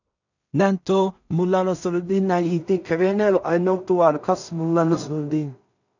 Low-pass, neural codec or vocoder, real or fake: 7.2 kHz; codec, 16 kHz in and 24 kHz out, 0.4 kbps, LongCat-Audio-Codec, two codebook decoder; fake